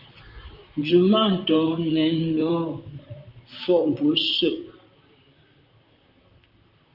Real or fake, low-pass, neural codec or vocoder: fake; 5.4 kHz; vocoder, 44.1 kHz, 128 mel bands, Pupu-Vocoder